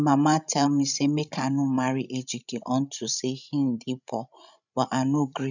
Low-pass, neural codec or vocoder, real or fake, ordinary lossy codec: 7.2 kHz; codec, 16 kHz, 16 kbps, FreqCodec, larger model; fake; none